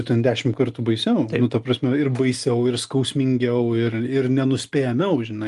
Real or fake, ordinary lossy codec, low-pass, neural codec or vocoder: real; Opus, 32 kbps; 10.8 kHz; none